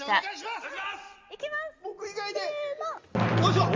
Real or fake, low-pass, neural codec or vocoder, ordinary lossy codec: real; 7.2 kHz; none; Opus, 32 kbps